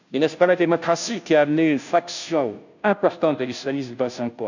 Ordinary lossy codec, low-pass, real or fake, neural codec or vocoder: none; 7.2 kHz; fake; codec, 16 kHz, 0.5 kbps, FunCodec, trained on Chinese and English, 25 frames a second